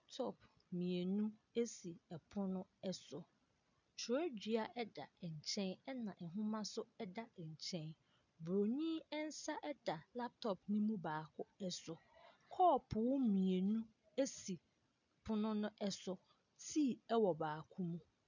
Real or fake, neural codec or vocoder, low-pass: real; none; 7.2 kHz